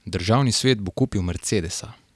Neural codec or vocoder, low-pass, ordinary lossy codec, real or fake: none; none; none; real